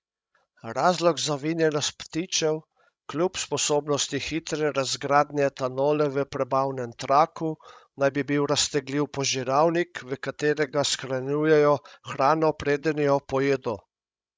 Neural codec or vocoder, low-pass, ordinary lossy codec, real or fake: codec, 16 kHz, 16 kbps, FreqCodec, larger model; none; none; fake